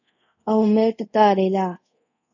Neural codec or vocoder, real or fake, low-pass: codec, 24 kHz, 0.5 kbps, DualCodec; fake; 7.2 kHz